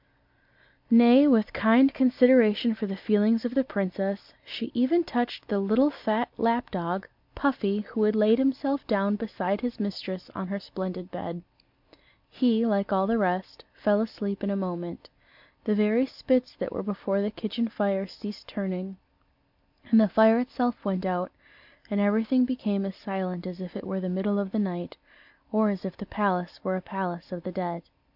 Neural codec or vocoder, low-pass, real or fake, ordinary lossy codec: none; 5.4 kHz; real; MP3, 48 kbps